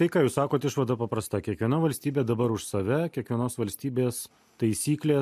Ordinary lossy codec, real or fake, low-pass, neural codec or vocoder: MP3, 64 kbps; real; 14.4 kHz; none